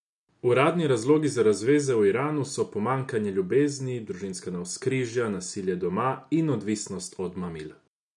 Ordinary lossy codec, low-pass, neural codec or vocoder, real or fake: none; 10.8 kHz; none; real